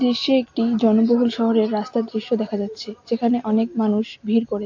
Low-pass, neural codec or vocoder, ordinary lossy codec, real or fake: 7.2 kHz; none; AAC, 48 kbps; real